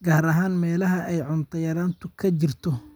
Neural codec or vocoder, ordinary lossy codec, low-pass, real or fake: none; none; none; real